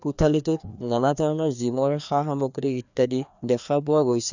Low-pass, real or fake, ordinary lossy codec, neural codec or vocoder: 7.2 kHz; fake; none; codec, 16 kHz, 2 kbps, FreqCodec, larger model